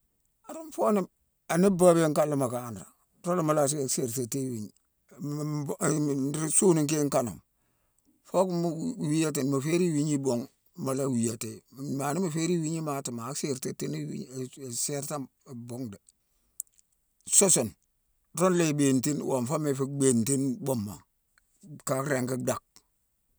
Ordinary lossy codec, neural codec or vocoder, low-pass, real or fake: none; none; none; real